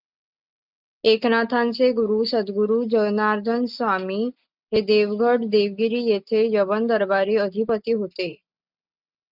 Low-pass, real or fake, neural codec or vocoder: 5.4 kHz; real; none